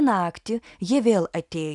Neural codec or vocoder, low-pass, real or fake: none; 10.8 kHz; real